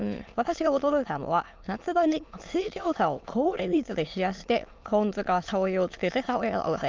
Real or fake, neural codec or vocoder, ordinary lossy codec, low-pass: fake; autoencoder, 22.05 kHz, a latent of 192 numbers a frame, VITS, trained on many speakers; Opus, 24 kbps; 7.2 kHz